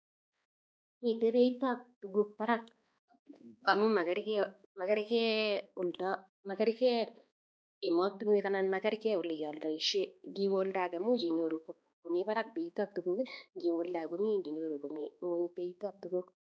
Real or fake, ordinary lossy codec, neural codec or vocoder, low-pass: fake; none; codec, 16 kHz, 2 kbps, X-Codec, HuBERT features, trained on balanced general audio; none